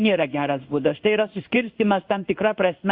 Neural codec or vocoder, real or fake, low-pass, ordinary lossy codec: codec, 16 kHz in and 24 kHz out, 1 kbps, XY-Tokenizer; fake; 5.4 kHz; Opus, 64 kbps